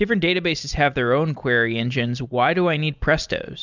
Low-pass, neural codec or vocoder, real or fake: 7.2 kHz; none; real